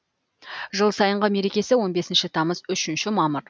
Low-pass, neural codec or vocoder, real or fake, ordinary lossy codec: none; none; real; none